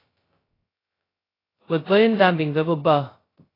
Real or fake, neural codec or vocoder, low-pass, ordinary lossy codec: fake; codec, 16 kHz, 0.2 kbps, FocalCodec; 5.4 kHz; AAC, 24 kbps